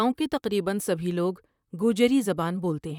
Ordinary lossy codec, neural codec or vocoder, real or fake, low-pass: none; vocoder, 44.1 kHz, 128 mel bands, Pupu-Vocoder; fake; 19.8 kHz